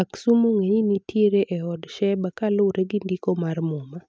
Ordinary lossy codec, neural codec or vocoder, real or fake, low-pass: none; none; real; none